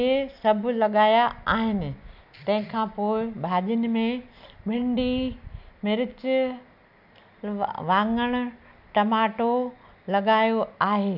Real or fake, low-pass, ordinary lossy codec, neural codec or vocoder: real; 5.4 kHz; none; none